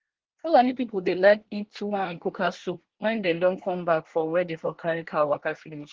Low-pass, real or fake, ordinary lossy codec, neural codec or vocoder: 7.2 kHz; fake; Opus, 16 kbps; codec, 24 kHz, 1 kbps, SNAC